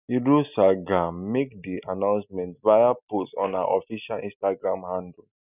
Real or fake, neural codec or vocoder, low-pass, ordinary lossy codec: real; none; 3.6 kHz; none